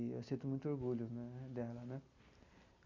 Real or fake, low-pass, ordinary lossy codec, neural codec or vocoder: real; 7.2 kHz; Opus, 64 kbps; none